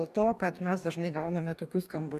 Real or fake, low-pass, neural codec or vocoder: fake; 14.4 kHz; codec, 44.1 kHz, 2.6 kbps, DAC